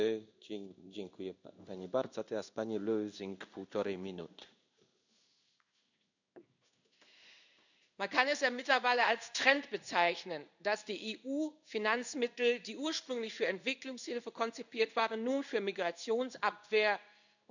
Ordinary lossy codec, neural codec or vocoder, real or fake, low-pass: none; codec, 16 kHz in and 24 kHz out, 1 kbps, XY-Tokenizer; fake; 7.2 kHz